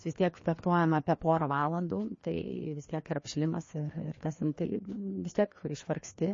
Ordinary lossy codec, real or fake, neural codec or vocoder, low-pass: MP3, 32 kbps; fake; codec, 16 kHz, 2 kbps, FreqCodec, larger model; 7.2 kHz